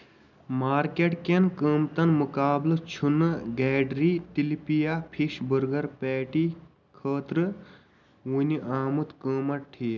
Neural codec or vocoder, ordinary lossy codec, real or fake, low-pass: none; none; real; 7.2 kHz